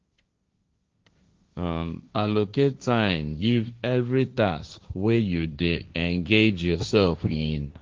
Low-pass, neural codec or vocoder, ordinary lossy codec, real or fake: 7.2 kHz; codec, 16 kHz, 1.1 kbps, Voila-Tokenizer; Opus, 24 kbps; fake